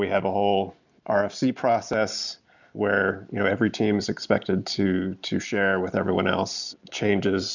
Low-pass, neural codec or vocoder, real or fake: 7.2 kHz; none; real